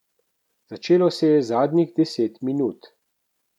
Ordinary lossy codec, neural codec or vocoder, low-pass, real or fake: none; none; 19.8 kHz; real